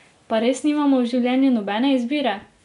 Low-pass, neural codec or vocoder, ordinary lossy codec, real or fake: 10.8 kHz; none; none; real